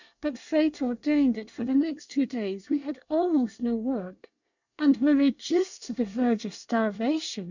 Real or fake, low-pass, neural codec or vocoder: fake; 7.2 kHz; codec, 24 kHz, 1 kbps, SNAC